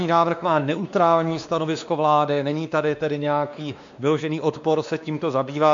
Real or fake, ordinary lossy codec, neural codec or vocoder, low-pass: fake; MP3, 64 kbps; codec, 16 kHz, 2 kbps, X-Codec, WavLM features, trained on Multilingual LibriSpeech; 7.2 kHz